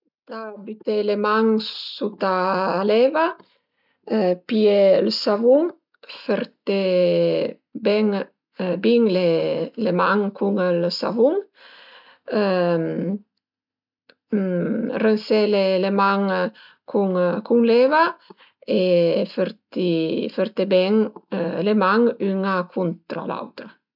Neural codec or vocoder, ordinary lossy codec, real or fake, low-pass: none; none; real; 5.4 kHz